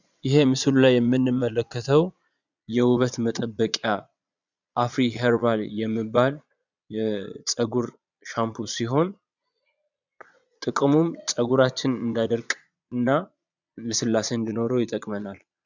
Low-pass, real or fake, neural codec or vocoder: 7.2 kHz; fake; vocoder, 22.05 kHz, 80 mel bands, Vocos